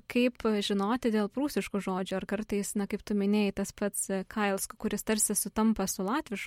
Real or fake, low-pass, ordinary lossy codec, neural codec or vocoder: real; 19.8 kHz; MP3, 64 kbps; none